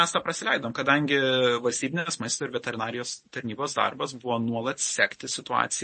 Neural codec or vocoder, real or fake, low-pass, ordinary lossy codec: none; real; 10.8 kHz; MP3, 32 kbps